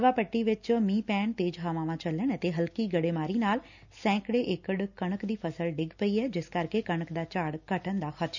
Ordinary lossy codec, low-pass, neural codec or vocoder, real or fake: none; 7.2 kHz; none; real